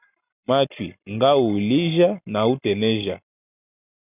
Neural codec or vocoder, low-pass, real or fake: none; 3.6 kHz; real